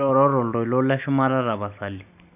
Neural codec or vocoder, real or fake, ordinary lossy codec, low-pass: none; real; none; 3.6 kHz